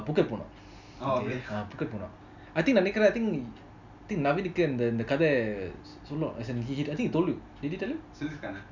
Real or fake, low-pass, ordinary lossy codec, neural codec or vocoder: real; 7.2 kHz; none; none